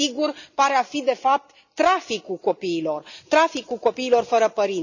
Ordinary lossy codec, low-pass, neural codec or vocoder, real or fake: none; 7.2 kHz; none; real